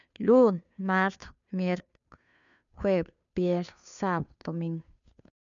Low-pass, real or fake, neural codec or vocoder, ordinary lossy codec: 7.2 kHz; fake; codec, 16 kHz, 2 kbps, FunCodec, trained on Chinese and English, 25 frames a second; none